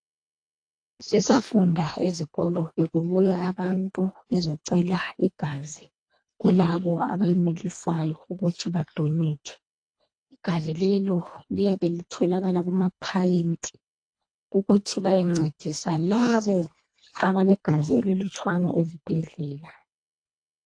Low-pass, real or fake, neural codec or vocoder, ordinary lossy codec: 9.9 kHz; fake; codec, 24 kHz, 1.5 kbps, HILCodec; AAC, 48 kbps